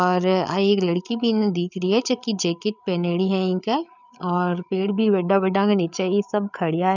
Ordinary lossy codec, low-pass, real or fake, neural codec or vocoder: none; 7.2 kHz; fake; codec, 16 kHz, 8 kbps, FreqCodec, larger model